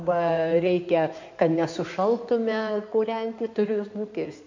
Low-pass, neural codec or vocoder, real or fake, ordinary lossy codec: 7.2 kHz; codec, 16 kHz in and 24 kHz out, 2.2 kbps, FireRedTTS-2 codec; fake; MP3, 64 kbps